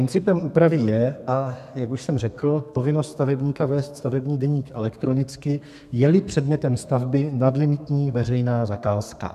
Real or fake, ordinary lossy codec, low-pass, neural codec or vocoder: fake; MP3, 96 kbps; 14.4 kHz; codec, 32 kHz, 1.9 kbps, SNAC